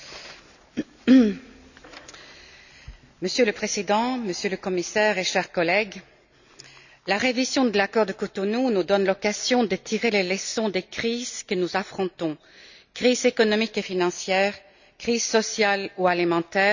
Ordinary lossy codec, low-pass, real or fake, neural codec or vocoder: none; 7.2 kHz; real; none